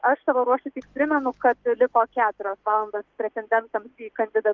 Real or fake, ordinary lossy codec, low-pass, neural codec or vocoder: real; Opus, 32 kbps; 7.2 kHz; none